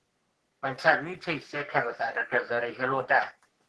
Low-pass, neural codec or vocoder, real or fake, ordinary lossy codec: 10.8 kHz; codec, 44.1 kHz, 3.4 kbps, Pupu-Codec; fake; Opus, 16 kbps